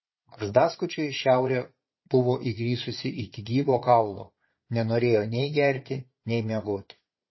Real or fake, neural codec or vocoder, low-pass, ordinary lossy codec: fake; vocoder, 22.05 kHz, 80 mel bands, Vocos; 7.2 kHz; MP3, 24 kbps